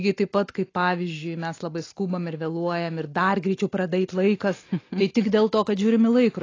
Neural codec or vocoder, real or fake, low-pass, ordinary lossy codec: none; real; 7.2 kHz; AAC, 32 kbps